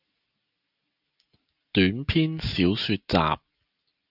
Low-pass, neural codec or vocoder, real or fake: 5.4 kHz; none; real